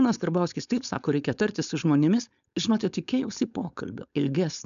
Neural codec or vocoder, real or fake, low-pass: codec, 16 kHz, 4.8 kbps, FACodec; fake; 7.2 kHz